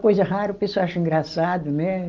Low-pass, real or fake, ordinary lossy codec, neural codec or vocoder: 7.2 kHz; real; Opus, 32 kbps; none